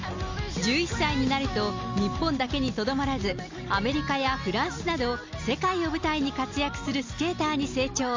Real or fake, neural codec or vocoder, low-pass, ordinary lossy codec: real; none; 7.2 kHz; MP3, 64 kbps